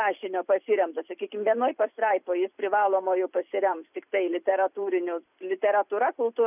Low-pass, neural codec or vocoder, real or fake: 3.6 kHz; vocoder, 44.1 kHz, 128 mel bands every 256 samples, BigVGAN v2; fake